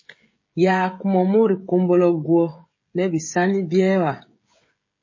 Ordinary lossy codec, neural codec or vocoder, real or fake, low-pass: MP3, 32 kbps; codec, 16 kHz, 16 kbps, FreqCodec, smaller model; fake; 7.2 kHz